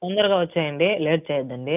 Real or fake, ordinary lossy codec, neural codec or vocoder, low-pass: real; none; none; 3.6 kHz